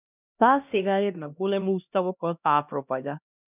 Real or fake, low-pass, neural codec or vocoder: fake; 3.6 kHz; codec, 16 kHz, 1 kbps, X-Codec, HuBERT features, trained on LibriSpeech